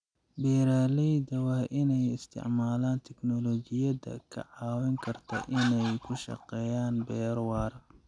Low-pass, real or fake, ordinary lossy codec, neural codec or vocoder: 9.9 kHz; real; none; none